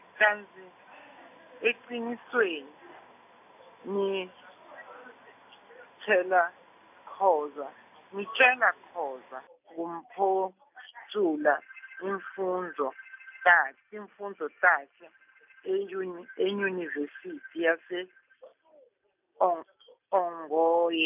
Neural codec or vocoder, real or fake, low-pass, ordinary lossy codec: none; real; 3.6 kHz; none